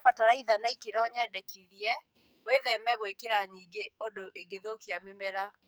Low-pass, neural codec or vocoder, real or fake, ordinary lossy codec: none; codec, 44.1 kHz, 2.6 kbps, SNAC; fake; none